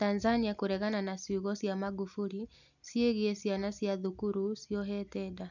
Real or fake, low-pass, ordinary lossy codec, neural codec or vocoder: real; 7.2 kHz; none; none